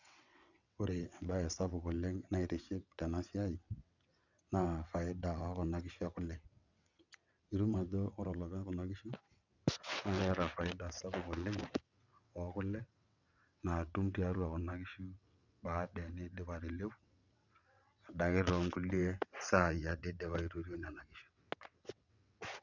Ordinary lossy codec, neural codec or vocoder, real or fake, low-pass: none; vocoder, 22.05 kHz, 80 mel bands, WaveNeXt; fake; 7.2 kHz